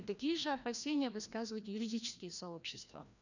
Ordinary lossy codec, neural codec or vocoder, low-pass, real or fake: none; codec, 16 kHz, 1 kbps, FreqCodec, larger model; 7.2 kHz; fake